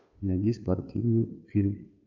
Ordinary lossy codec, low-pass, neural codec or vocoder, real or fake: AAC, 48 kbps; 7.2 kHz; codec, 16 kHz, 2 kbps, FunCodec, trained on Chinese and English, 25 frames a second; fake